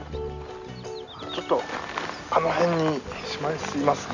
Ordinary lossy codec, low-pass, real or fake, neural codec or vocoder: none; 7.2 kHz; real; none